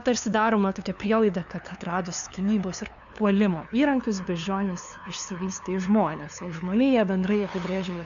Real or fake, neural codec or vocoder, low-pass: fake; codec, 16 kHz, 2 kbps, FunCodec, trained on LibriTTS, 25 frames a second; 7.2 kHz